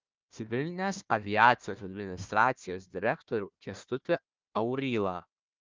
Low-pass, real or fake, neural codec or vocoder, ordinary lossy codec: 7.2 kHz; fake; codec, 16 kHz, 1 kbps, FunCodec, trained on Chinese and English, 50 frames a second; Opus, 24 kbps